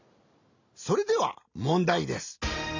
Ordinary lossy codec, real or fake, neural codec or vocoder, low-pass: AAC, 48 kbps; real; none; 7.2 kHz